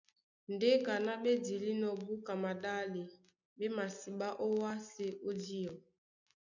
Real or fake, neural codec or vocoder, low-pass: real; none; 7.2 kHz